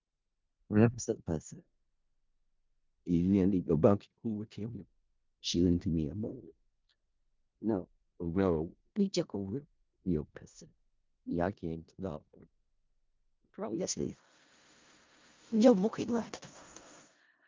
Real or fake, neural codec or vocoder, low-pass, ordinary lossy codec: fake; codec, 16 kHz in and 24 kHz out, 0.4 kbps, LongCat-Audio-Codec, four codebook decoder; 7.2 kHz; Opus, 24 kbps